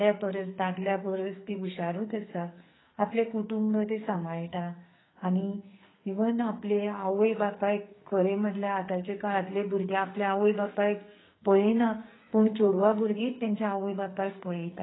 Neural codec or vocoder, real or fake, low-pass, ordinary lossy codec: codec, 44.1 kHz, 2.6 kbps, SNAC; fake; 7.2 kHz; AAC, 16 kbps